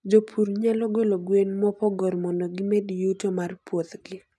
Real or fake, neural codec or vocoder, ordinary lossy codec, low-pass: real; none; none; none